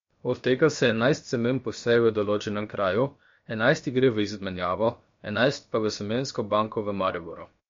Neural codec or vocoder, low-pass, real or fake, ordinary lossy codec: codec, 16 kHz, 0.7 kbps, FocalCodec; 7.2 kHz; fake; MP3, 48 kbps